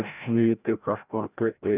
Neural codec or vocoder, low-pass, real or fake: codec, 16 kHz, 0.5 kbps, FreqCodec, larger model; 3.6 kHz; fake